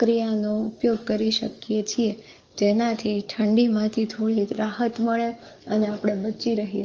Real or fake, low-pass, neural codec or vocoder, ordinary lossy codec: fake; 7.2 kHz; codec, 44.1 kHz, 7.8 kbps, DAC; Opus, 24 kbps